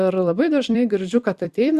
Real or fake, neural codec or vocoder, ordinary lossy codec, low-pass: fake; vocoder, 44.1 kHz, 128 mel bands every 256 samples, BigVGAN v2; Opus, 64 kbps; 14.4 kHz